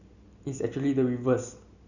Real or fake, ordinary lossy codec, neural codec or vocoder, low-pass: real; none; none; 7.2 kHz